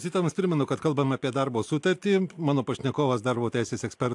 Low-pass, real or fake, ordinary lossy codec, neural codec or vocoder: 10.8 kHz; real; AAC, 64 kbps; none